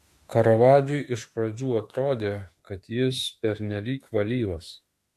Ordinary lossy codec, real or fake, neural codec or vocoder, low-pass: AAC, 64 kbps; fake; autoencoder, 48 kHz, 32 numbers a frame, DAC-VAE, trained on Japanese speech; 14.4 kHz